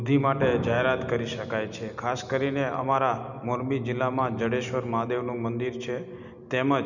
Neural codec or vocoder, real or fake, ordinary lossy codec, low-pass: none; real; none; 7.2 kHz